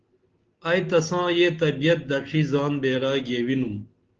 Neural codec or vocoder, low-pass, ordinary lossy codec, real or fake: none; 7.2 kHz; Opus, 16 kbps; real